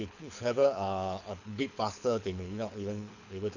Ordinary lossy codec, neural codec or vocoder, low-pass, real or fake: none; codec, 24 kHz, 6 kbps, HILCodec; 7.2 kHz; fake